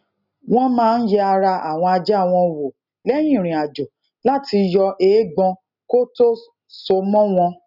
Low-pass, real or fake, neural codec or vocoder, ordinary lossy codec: 5.4 kHz; real; none; none